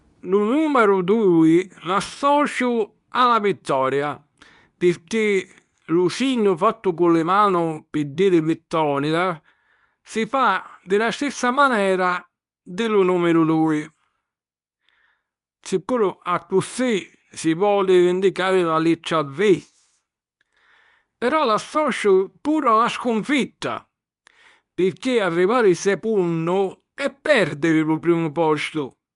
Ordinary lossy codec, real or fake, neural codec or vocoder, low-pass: none; fake; codec, 24 kHz, 0.9 kbps, WavTokenizer, medium speech release version 2; 10.8 kHz